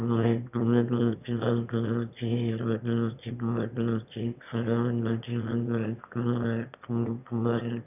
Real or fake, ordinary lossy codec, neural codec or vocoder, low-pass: fake; none; autoencoder, 22.05 kHz, a latent of 192 numbers a frame, VITS, trained on one speaker; 3.6 kHz